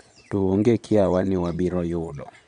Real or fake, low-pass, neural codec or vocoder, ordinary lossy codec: fake; 9.9 kHz; vocoder, 22.05 kHz, 80 mel bands, Vocos; none